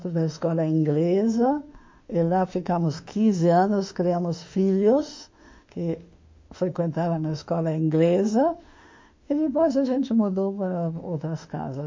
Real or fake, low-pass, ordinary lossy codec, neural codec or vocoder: fake; 7.2 kHz; MP3, 48 kbps; autoencoder, 48 kHz, 32 numbers a frame, DAC-VAE, trained on Japanese speech